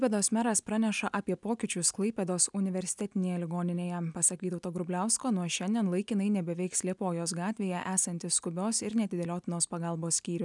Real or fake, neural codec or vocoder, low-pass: real; none; 10.8 kHz